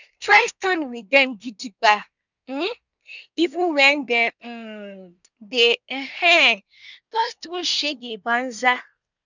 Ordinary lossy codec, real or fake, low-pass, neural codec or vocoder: none; fake; 7.2 kHz; codec, 24 kHz, 1 kbps, SNAC